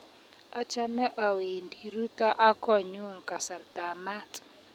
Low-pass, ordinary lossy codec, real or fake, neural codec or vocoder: 19.8 kHz; MP3, 96 kbps; fake; codec, 44.1 kHz, 7.8 kbps, DAC